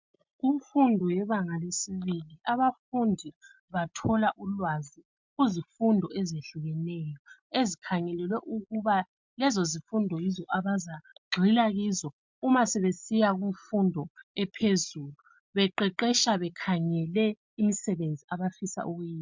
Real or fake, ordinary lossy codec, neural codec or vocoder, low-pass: real; MP3, 64 kbps; none; 7.2 kHz